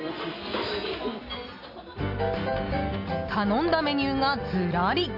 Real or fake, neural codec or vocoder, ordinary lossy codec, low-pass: real; none; AAC, 48 kbps; 5.4 kHz